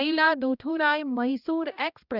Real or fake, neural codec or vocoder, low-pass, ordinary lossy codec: fake; codec, 16 kHz, 1 kbps, X-Codec, HuBERT features, trained on balanced general audio; 5.4 kHz; none